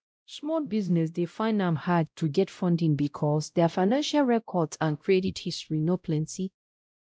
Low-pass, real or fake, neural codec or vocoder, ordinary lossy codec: none; fake; codec, 16 kHz, 0.5 kbps, X-Codec, WavLM features, trained on Multilingual LibriSpeech; none